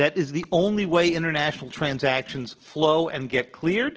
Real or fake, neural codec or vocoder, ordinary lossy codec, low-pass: real; none; Opus, 16 kbps; 7.2 kHz